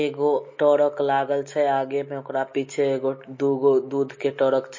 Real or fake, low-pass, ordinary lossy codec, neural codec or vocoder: real; 7.2 kHz; MP3, 32 kbps; none